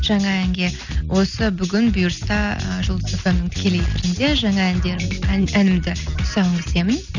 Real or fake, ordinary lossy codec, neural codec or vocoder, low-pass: real; none; none; 7.2 kHz